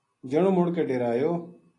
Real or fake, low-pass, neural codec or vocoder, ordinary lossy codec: real; 10.8 kHz; none; AAC, 32 kbps